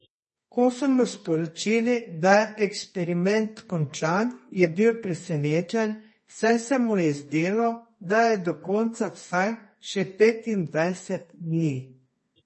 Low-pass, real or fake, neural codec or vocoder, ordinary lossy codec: 10.8 kHz; fake; codec, 24 kHz, 0.9 kbps, WavTokenizer, medium music audio release; MP3, 32 kbps